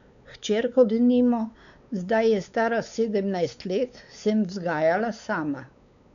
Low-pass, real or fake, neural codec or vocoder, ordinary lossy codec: 7.2 kHz; fake; codec, 16 kHz, 4 kbps, X-Codec, WavLM features, trained on Multilingual LibriSpeech; none